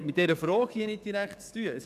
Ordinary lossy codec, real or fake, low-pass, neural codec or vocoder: none; fake; 14.4 kHz; codec, 44.1 kHz, 7.8 kbps, DAC